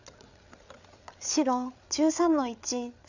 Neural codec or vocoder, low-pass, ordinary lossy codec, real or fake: codec, 16 kHz, 8 kbps, FreqCodec, larger model; 7.2 kHz; none; fake